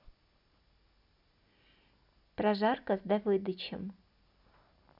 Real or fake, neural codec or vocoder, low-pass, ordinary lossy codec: real; none; 5.4 kHz; none